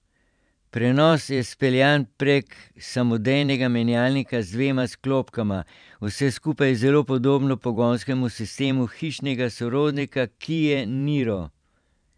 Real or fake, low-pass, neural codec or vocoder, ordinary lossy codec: fake; 9.9 kHz; vocoder, 44.1 kHz, 128 mel bands every 512 samples, BigVGAN v2; none